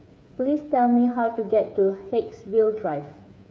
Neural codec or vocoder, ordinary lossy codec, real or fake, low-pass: codec, 16 kHz, 8 kbps, FreqCodec, smaller model; none; fake; none